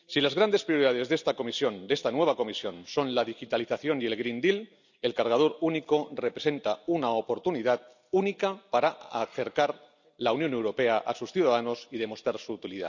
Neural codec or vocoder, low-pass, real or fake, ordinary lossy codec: none; 7.2 kHz; real; none